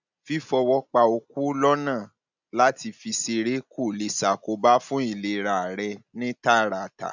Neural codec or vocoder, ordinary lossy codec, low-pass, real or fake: none; none; 7.2 kHz; real